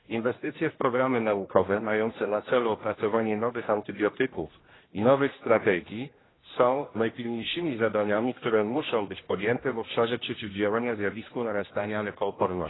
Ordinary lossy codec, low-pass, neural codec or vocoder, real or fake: AAC, 16 kbps; 7.2 kHz; codec, 16 kHz, 1 kbps, X-Codec, HuBERT features, trained on general audio; fake